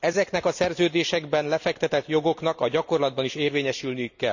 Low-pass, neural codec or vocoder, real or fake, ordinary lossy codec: 7.2 kHz; none; real; none